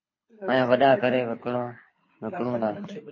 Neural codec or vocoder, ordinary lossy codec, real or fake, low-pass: codec, 24 kHz, 6 kbps, HILCodec; MP3, 32 kbps; fake; 7.2 kHz